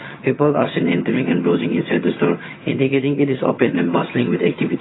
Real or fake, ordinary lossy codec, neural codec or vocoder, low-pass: fake; AAC, 16 kbps; vocoder, 22.05 kHz, 80 mel bands, HiFi-GAN; 7.2 kHz